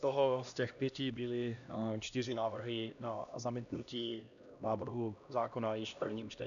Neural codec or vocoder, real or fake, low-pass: codec, 16 kHz, 1 kbps, X-Codec, HuBERT features, trained on LibriSpeech; fake; 7.2 kHz